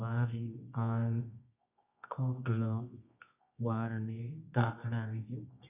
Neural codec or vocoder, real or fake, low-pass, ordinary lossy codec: codec, 24 kHz, 0.9 kbps, WavTokenizer, medium music audio release; fake; 3.6 kHz; none